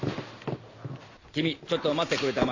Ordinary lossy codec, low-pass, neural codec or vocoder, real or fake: AAC, 48 kbps; 7.2 kHz; none; real